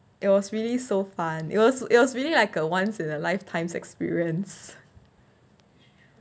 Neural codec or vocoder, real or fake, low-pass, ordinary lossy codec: none; real; none; none